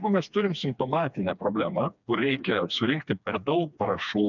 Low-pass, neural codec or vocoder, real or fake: 7.2 kHz; codec, 16 kHz, 2 kbps, FreqCodec, smaller model; fake